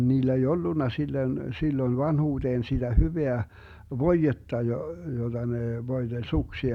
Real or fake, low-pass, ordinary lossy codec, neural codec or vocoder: real; 19.8 kHz; none; none